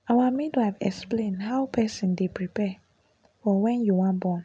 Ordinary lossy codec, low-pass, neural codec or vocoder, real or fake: none; 9.9 kHz; none; real